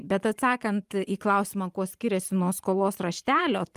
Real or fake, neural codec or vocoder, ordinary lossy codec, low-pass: fake; vocoder, 44.1 kHz, 128 mel bands every 512 samples, BigVGAN v2; Opus, 32 kbps; 14.4 kHz